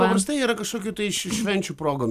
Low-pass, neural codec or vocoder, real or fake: 14.4 kHz; vocoder, 44.1 kHz, 128 mel bands every 256 samples, BigVGAN v2; fake